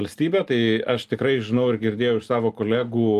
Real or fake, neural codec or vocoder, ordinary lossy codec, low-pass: real; none; Opus, 32 kbps; 14.4 kHz